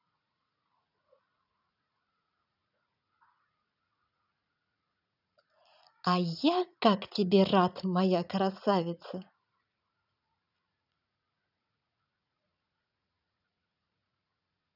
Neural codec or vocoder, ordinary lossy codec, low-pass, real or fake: vocoder, 44.1 kHz, 80 mel bands, Vocos; none; 5.4 kHz; fake